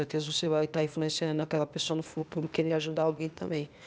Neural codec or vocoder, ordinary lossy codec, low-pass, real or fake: codec, 16 kHz, 0.8 kbps, ZipCodec; none; none; fake